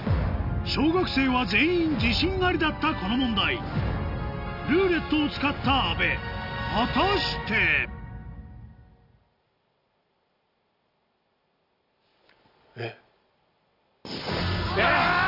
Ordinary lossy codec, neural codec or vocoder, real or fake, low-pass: none; none; real; 5.4 kHz